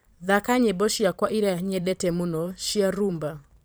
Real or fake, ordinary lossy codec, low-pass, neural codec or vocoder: fake; none; none; vocoder, 44.1 kHz, 128 mel bands every 512 samples, BigVGAN v2